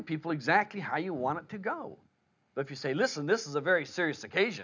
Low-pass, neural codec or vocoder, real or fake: 7.2 kHz; none; real